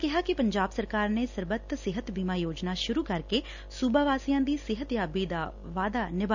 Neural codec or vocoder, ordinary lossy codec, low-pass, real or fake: none; none; 7.2 kHz; real